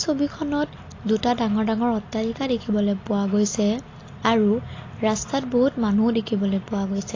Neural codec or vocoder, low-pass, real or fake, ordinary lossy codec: none; 7.2 kHz; real; AAC, 32 kbps